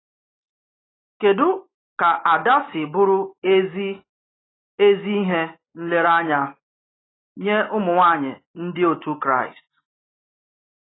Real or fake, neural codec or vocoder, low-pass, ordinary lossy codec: real; none; 7.2 kHz; AAC, 16 kbps